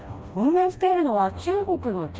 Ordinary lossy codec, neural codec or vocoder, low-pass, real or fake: none; codec, 16 kHz, 1 kbps, FreqCodec, smaller model; none; fake